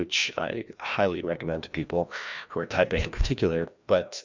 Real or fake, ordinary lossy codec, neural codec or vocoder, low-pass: fake; MP3, 64 kbps; codec, 16 kHz, 1 kbps, FreqCodec, larger model; 7.2 kHz